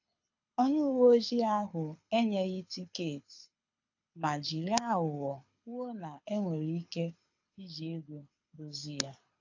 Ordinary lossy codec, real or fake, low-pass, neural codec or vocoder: AAC, 48 kbps; fake; 7.2 kHz; codec, 24 kHz, 6 kbps, HILCodec